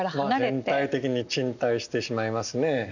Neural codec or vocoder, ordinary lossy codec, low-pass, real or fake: none; none; 7.2 kHz; real